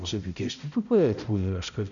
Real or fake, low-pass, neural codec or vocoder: fake; 7.2 kHz; codec, 16 kHz, 0.5 kbps, X-Codec, HuBERT features, trained on balanced general audio